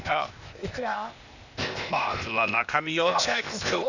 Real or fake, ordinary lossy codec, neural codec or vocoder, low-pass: fake; none; codec, 16 kHz, 0.8 kbps, ZipCodec; 7.2 kHz